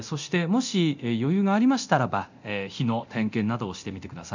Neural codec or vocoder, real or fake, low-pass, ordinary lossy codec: codec, 24 kHz, 0.9 kbps, DualCodec; fake; 7.2 kHz; none